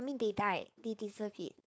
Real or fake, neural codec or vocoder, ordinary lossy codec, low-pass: fake; codec, 16 kHz, 4.8 kbps, FACodec; none; none